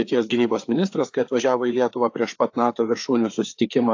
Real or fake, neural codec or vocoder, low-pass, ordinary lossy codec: fake; codec, 16 kHz, 4 kbps, FreqCodec, larger model; 7.2 kHz; AAC, 48 kbps